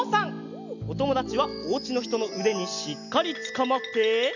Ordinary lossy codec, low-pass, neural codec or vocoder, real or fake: none; 7.2 kHz; none; real